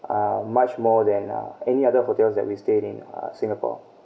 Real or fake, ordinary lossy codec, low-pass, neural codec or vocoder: real; none; none; none